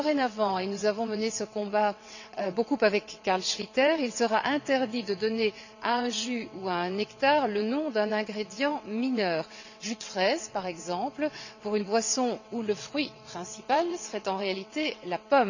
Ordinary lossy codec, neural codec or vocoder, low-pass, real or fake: none; vocoder, 22.05 kHz, 80 mel bands, WaveNeXt; 7.2 kHz; fake